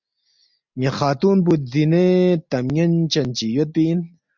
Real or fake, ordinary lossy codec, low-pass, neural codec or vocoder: real; MP3, 64 kbps; 7.2 kHz; none